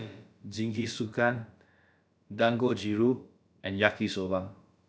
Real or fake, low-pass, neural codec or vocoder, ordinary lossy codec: fake; none; codec, 16 kHz, about 1 kbps, DyCAST, with the encoder's durations; none